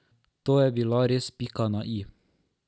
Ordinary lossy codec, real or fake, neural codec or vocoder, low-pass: none; real; none; none